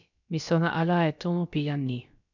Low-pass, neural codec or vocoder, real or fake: 7.2 kHz; codec, 16 kHz, about 1 kbps, DyCAST, with the encoder's durations; fake